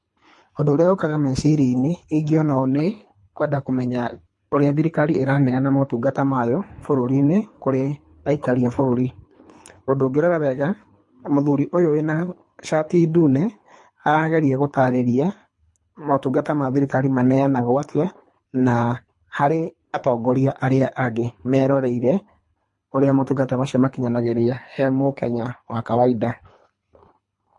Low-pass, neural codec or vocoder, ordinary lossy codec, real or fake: 10.8 kHz; codec, 24 kHz, 3 kbps, HILCodec; MP3, 48 kbps; fake